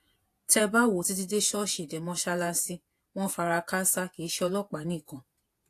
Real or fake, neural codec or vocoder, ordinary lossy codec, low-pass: fake; vocoder, 48 kHz, 128 mel bands, Vocos; AAC, 64 kbps; 14.4 kHz